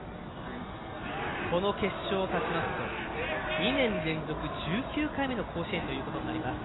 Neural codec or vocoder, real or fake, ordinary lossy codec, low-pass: none; real; AAC, 16 kbps; 7.2 kHz